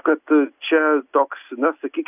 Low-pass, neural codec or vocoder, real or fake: 3.6 kHz; none; real